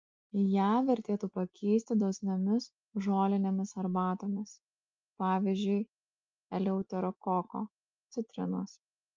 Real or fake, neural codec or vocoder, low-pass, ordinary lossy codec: real; none; 7.2 kHz; Opus, 24 kbps